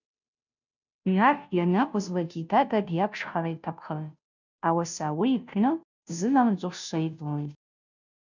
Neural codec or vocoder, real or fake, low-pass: codec, 16 kHz, 0.5 kbps, FunCodec, trained on Chinese and English, 25 frames a second; fake; 7.2 kHz